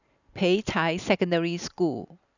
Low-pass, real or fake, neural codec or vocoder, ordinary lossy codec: 7.2 kHz; real; none; none